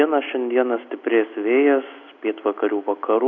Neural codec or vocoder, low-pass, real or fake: none; 7.2 kHz; real